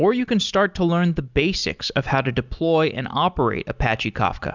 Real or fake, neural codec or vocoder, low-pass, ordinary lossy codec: real; none; 7.2 kHz; Opus, 64 kbps